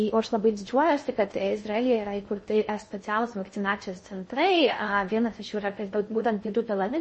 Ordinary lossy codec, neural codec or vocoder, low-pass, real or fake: MP3, 32 kbps; codec, 16 kHz in and 24 kHz out, 0.6 kbps, FocalCodec, streaming, 2048 codes; 10.8 kHz; fake